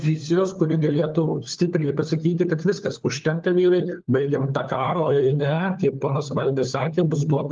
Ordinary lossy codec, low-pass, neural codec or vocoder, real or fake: Opus, 32 kbps; 7.2 kHz; codec, 16 kHz, 4 kbps, FunCodec, trained on LibriTTS, 50 frames a second; fake